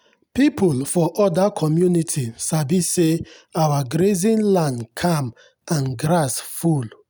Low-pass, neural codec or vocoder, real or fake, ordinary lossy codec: none; none; real; none